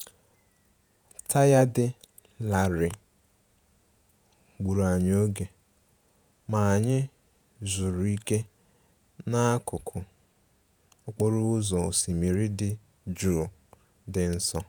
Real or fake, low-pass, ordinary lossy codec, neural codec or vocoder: fake; none; none; vocoder, 48 kHz, 128 mel bands, Vocos